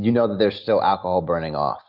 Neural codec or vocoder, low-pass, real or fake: none; 5.4 kHz; real